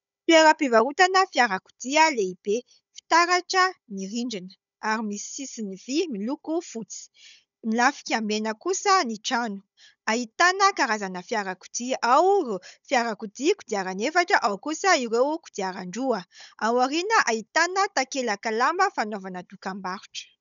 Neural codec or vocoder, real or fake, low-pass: codec, 16 kHz, 16 kbps, FunCodec, trained on Chinese and English, 50 frames a second; fake; 7.2 kHz